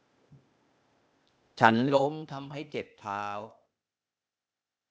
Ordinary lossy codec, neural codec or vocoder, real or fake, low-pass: none; codec, 16 kHz, 0.8 kbps, ZipCodec; fake; none